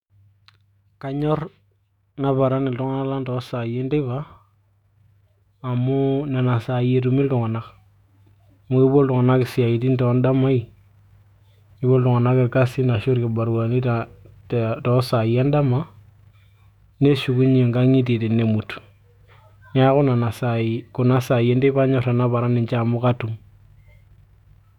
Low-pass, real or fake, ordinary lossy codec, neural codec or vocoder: 19.8 kHz; fake; none; autoencoder, 48 kHz, 128 numbers a frame, DAC-VAE, trained on Japanese speech